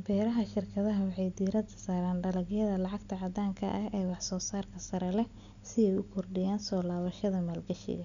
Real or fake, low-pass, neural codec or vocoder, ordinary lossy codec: real; 7.2 kHz; none; none